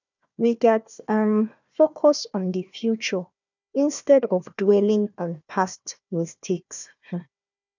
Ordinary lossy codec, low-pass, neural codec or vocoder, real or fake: none; 7.2 kHz; codec, 16 kHz, 1 kbps, FunCodec, trained on Chinese and English, 50 frames a second; fake